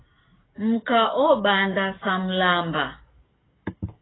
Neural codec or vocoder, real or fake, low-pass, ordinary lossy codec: codec, 44.1 kHz, 7.8 kbps, DAC; fake; 7.2 kHz; AAC, 16 kbps